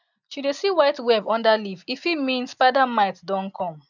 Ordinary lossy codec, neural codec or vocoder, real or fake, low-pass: none; none; real; 7.2 kHz